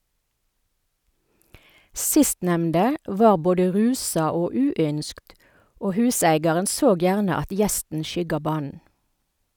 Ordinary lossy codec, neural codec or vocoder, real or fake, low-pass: none; none; real; none